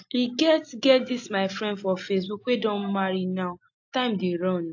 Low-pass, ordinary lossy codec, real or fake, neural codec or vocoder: 7.2 kHz; AAC, 48 kbps; real; none